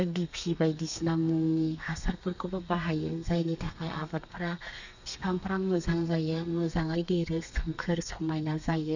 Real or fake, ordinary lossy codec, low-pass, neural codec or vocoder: fake; none; 7.2 kHz; codec, 32 kHz, 1.9 kbps, SNAC